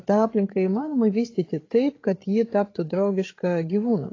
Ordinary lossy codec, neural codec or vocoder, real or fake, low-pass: AAC, 32 kbps; codec, 16 kHz, 8 kbps, FreqCodec, larger model; fake; 7.2 kHz